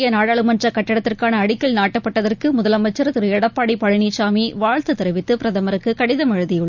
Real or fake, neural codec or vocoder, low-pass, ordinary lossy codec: real; none; 7.2 kHz; none